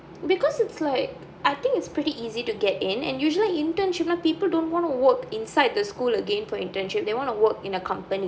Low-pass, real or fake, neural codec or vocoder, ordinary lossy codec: none; real; none; none